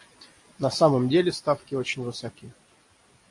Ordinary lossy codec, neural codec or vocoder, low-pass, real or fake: AAC, 48 kbps; none; 10.8 kHz; real